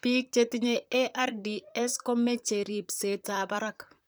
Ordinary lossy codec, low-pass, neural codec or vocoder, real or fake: none; none; vocoder, 44.1 kHz, 128 mel bands, Pupu-Vocoder; fake